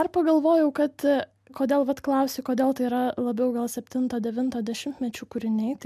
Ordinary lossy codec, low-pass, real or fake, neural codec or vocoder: MP3, 96 kbps; 14.4 kHz; real; none